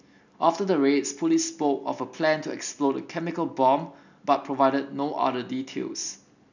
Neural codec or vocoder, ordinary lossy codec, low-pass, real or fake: none; none; 7.2 kHz; real